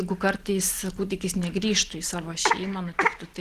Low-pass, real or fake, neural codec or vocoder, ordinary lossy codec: 14.4 kHz; real; none; Opus, 16 kbps